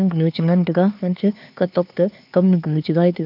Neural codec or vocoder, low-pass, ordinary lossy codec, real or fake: codec, 16 kHz, 4 kbps, X-Codec, HuBERT features, trained on LibriSpeech; 5.4 kHz; none; fake